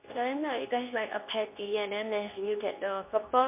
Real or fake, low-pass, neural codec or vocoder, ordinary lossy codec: fake; 3.6 kHz; codec, 24 kHz, 0.9 kbps, WavTokenizer, medium speech release version 2; MP3, 32 kbps